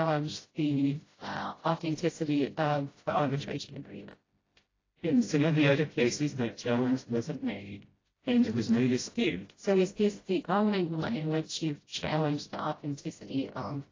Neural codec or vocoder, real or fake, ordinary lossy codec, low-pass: codec, 16 kHz, 0.5 kbps, FreqCodec, smaller model; fake; AAC, 32 kbps; 7.2 kHz